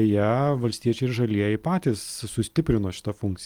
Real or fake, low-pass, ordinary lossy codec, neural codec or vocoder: real; 19.8 kHz; Opus, 32 kbps; none